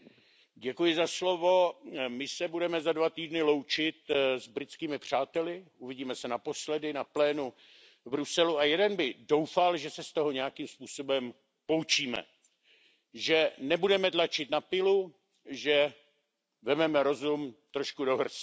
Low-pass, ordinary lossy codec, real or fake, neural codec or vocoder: none; none; real; none